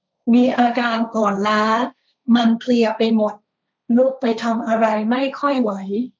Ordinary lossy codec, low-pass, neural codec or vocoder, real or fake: none; none; codec, 16 kHz, 1.1 kbps, Voila-Tokenizer; fake